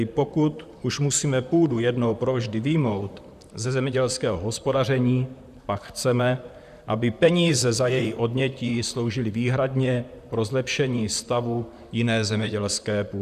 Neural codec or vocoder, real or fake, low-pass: vocoder, 44.1 kHz, 128 mel bands, Pupu-Vocoder; fake; 14.4 kHz